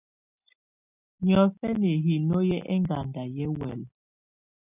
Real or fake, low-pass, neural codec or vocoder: real; 3.6 kHz; none